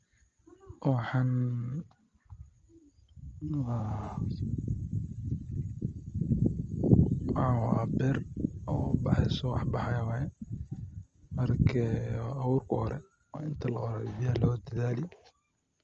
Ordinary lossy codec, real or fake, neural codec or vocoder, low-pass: Opus, 24 kbps; real; none; 7.2 kHz